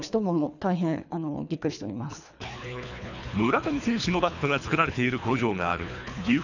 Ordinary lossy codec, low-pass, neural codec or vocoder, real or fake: none; 7.2 kHz; codec, 24 kHz, 3 kbps, HILCodec; fake